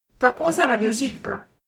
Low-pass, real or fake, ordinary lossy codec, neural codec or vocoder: 19.8 kHz; fake; none; codec, 44.1 kHz, 0.9 kbps, DAC